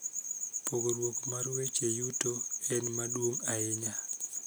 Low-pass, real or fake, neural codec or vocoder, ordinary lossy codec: none; real; none; none